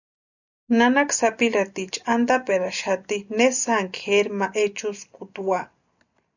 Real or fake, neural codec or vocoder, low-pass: real; none; 7.2 kHz